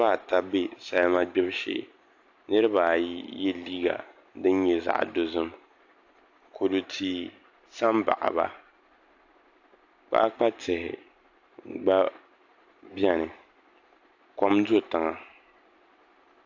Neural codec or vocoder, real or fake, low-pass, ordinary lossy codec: none; real; 7.2 kHz; AAC, 48 kbps